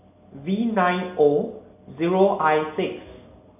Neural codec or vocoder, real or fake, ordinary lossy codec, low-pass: none; real; none; 3.6 kHz